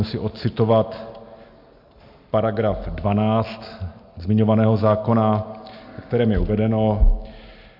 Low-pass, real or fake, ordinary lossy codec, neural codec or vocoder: 5.4 kHz; real; MP3, 48 kbps; none